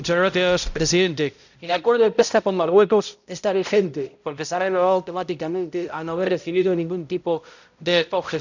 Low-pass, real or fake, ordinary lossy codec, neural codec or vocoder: 7.2 kHz; fake; none; codec, 16 kHz, 0.5 kbps, X-Codec, HuBERT features, trained on balanced general audio